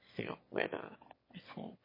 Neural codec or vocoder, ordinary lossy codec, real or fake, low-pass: autoencoder, 22.05 kHz, a latent of 192 numbers a frame, VITS, trained on one speaker; MP3, 24 kbps; fake; 5.4 kHz